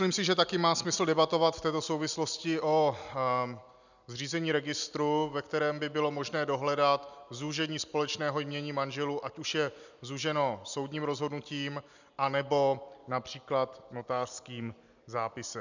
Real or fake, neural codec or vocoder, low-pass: real; none; 7.2 kHz